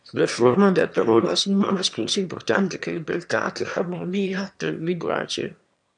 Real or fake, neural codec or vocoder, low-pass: fake; autoencoder, 22.05 kHz, a latent of 192 numbers a frame, VITS, trained on one speaker; 9.9 kHz